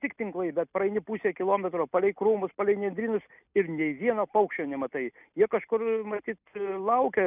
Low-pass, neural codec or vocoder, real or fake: 3.6 kHz; none; real